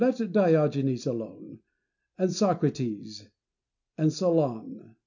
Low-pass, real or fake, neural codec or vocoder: 7.2 kHz; real; none